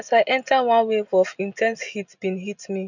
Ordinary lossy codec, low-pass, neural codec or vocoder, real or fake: none; 7.2 kHz; none; real